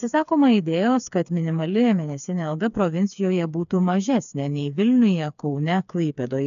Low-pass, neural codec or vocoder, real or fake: 7.2 kHz; codec, 16 kHz, 4 kbps, FreqCodec, smaller model; fake